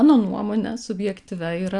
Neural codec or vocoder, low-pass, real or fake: none; 10.8 kHz; real